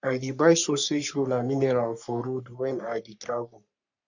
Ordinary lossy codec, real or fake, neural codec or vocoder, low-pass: none; fake; codec, 44.1 kHz, 3.4 kbps, Pupu-Codec; 7.2 kHz